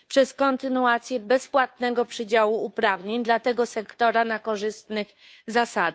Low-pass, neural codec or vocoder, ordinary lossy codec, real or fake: none; codec, 16 kHz, 2 kbps, FunCodec, trained on Chinese and English, 25 frames a second; none; fake